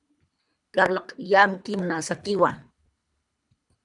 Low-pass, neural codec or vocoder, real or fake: 10.8 kHz; codec, 24 kHz, 3 kbps, HILCodec; fake